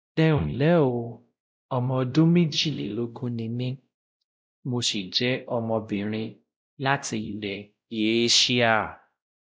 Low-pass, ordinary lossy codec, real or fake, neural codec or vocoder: none; none; fake; codec, 16 kHz, 0.5 kbps, X-Codec, WavLM features, trained on Multilingual LibriSpeech